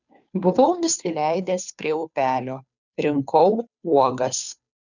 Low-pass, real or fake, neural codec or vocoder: 7.2 kHz; fake; codec, 16 kHz, 2 kbps, FunCodec, trained on Chinese and English, 25 frames a second